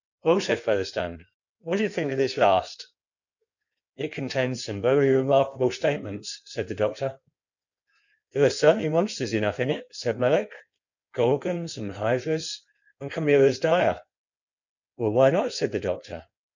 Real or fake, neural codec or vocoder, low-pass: fake; codec, 16 kHz in and 24 kHz out, 1.1 kbps, FireRedTTS-2 codec; 7.2 kHz